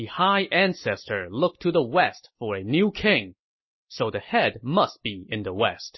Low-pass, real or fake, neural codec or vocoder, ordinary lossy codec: 7.2 kHz; real; none; MP3, 24 kbps